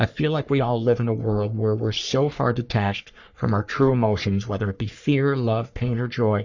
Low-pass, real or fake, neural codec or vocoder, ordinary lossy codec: 7.2 kHz; fake; codec, 44.1 kHz, 3.4 kbps, Pupu-Codec; Opus, 64 kbps